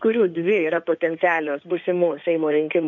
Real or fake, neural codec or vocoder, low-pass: fake; codec, 16 kHz in and 24 kHz out, 2.2 kbps, FireRedTTS-2 codec; 7.2 kHz